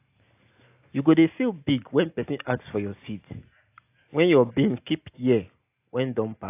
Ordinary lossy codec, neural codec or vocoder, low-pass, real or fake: AAC, 24 kbps; none; 3.6 kHz; real